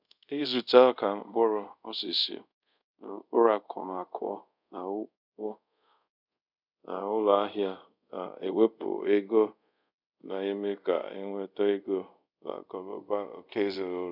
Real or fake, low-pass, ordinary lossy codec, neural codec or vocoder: fake; 5.4 kHz; none; codec, 24 kHz, 0.5 kbps, DualCodec